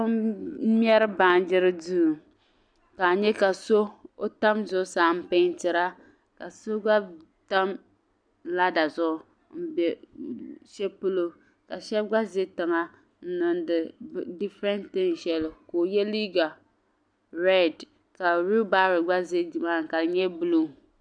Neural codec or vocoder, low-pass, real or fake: none; 9.9 kHz; real